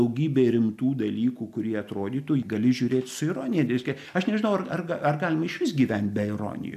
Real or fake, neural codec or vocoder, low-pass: real; none; 14.4 kHz